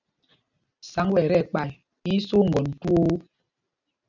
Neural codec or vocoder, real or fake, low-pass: none; real; 7.2 kHz